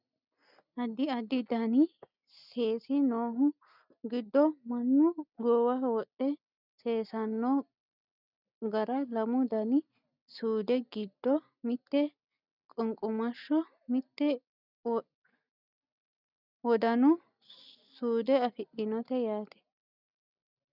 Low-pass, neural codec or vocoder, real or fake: 5.4 kHz; none; real